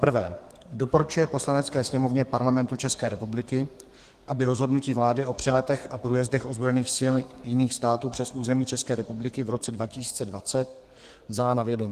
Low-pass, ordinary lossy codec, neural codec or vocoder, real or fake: 14.4 kHz; Opus, 24 kbps; codec, 32 kHz, 1.9 kbps, SNAC; fake